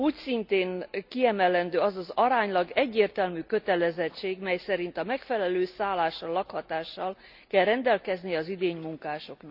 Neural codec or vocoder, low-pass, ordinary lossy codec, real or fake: none; 5.4 kHz; none; real